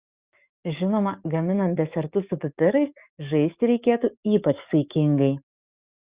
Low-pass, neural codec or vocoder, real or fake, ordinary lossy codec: 3.6 kHz; codec, 44.1 kHz, 7.8 kbps, DAC; fake; Opus, 64 kbps